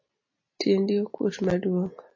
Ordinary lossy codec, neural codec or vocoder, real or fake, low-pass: MP3, 32 kbps; none; real; 7.2 kHz